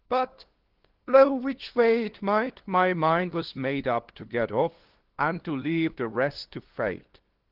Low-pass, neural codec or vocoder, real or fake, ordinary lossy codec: 5.4 kHz; codec, 16 kHz, 2 kbps, FunCodec, trained on LibriTTS, 25 frames a second; fake; Opus, 16 kbps